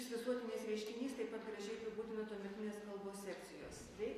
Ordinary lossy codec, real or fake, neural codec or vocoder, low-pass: AAC, 48 kbps; real; none; 14.4 kHz